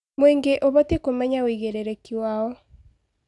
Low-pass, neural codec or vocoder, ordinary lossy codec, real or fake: 10.8 kHz; none; none; real